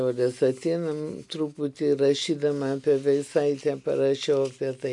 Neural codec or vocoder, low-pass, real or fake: none; 10.8 kHz; real